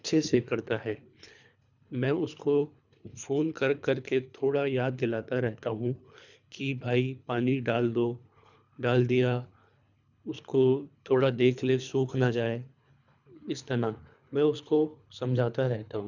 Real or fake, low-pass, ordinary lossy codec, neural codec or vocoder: fake; 7.2 kHz; none; codec, 24 kHz, 3 kbps, HILCodec